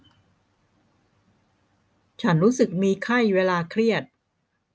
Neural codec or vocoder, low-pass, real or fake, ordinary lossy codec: none; none; real; none